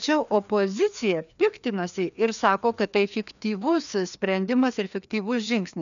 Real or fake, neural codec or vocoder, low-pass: fake; codec, 16 kHz, 2 kbps, FreqCodec, larger model; 7.2 kHz